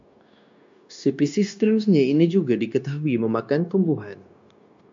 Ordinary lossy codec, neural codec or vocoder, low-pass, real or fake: MP3, 48 kbps; codec, 16 kHz, 0.9 kbps, LongCat-Audio-Codec; 7.2 kHz; fake